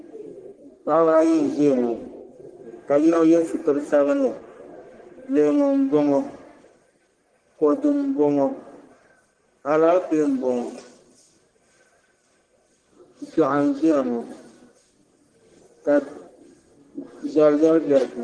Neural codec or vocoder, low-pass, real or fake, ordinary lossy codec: codec, 44.1 kHz, 1.7 kbps, Pupu-Codec; 9.9 kHz; fake; Opus, 16 kbps